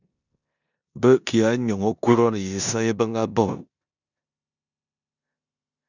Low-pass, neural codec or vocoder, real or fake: 7.2 kHz; codec, 16 kHz in and 24 kHz out, 0.9 kbps, LongCat-Audio-Codec, four codebook decoder; fake